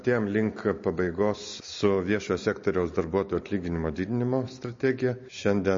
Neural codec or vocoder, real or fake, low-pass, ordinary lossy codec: none; real; 7.2 kHz; MP3, 32 kbps